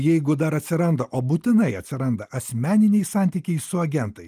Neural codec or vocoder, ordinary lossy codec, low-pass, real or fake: none; Opus, 32 kbps; 14.4 kHz; real